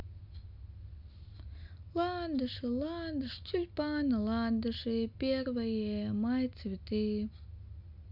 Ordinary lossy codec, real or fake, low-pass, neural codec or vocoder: none; real; 5.4 kHz; none